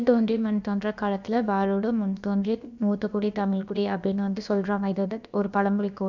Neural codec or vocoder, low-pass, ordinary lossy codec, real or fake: codec, 16 kHz, about 1 kbps, DyCAST, with the encoder's durations; 7.2 kHz; none; fake